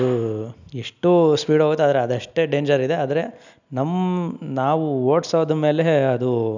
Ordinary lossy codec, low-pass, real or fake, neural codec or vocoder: none; 7.2 kHz; real; none